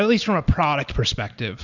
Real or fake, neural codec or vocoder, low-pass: real; none; 7.2 kHz